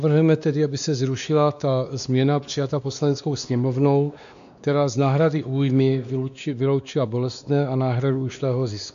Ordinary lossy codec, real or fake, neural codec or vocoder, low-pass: AAC, 96 kbps; fake; codec, 16 kHz, 2 kbps, X-Codec, WavLM features, trained on Multilingual LibriSpeech; 7.2 kHz